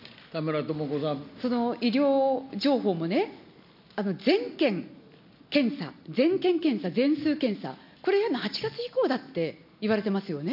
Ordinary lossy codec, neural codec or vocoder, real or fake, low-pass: none; none; real; 5.4 kHz